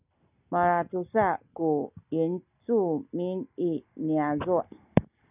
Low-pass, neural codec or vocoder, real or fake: 3.6 kHz; none; real